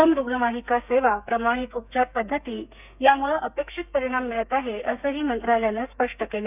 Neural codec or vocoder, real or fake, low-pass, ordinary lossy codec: codec, 32 kHz, 1.9 kbps, SNAC; fake; 3.6 kHz; none